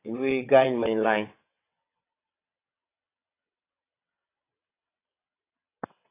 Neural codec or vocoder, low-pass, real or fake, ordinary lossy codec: vocoder, 22.05 kHz, 80 mel bands, WaveNeXt; 3.6 kHz; fake; AAC, 24 kbps